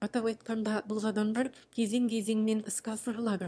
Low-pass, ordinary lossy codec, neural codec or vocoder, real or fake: none; none; autoencoder, 22.05 kHz, a latent of 192 numbers a frame, VITS, trained on one speaker; fake